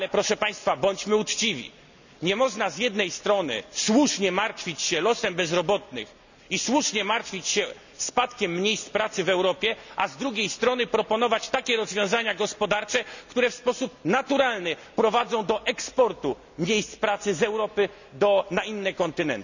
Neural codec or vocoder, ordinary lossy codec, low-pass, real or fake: none; none; 7.2 kHz; real